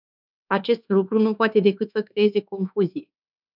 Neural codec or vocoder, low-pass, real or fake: codec, 24 kHz, 1.2 kbps, DualCodec; 5.4 kHz; fake